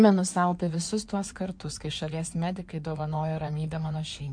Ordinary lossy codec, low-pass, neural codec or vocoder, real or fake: MP3, 64 kbps; 9.9 kHz; codec, 16 kHz in and 24 kHz out, 2.2 kbps, FireRedTTS-2 codec; fake